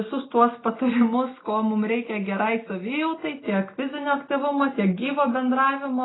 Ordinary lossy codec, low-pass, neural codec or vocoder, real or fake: AAC, 16 kbps; 7.2 kHz; none; real